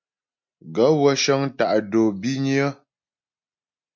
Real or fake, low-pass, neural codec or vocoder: real; 7.2 kHz; none